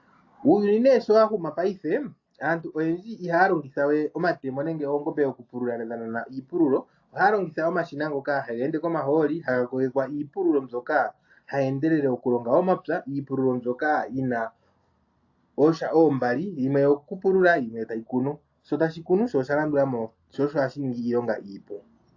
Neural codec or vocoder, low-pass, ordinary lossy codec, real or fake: none; 7.2 kHz; AAC, 48 kbps; real